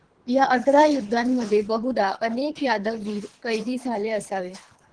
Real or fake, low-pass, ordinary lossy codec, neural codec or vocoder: fake; 9.9 kHz; Opus, 16 kbps; codec, 24 kHz, 3 kbps, HILCodec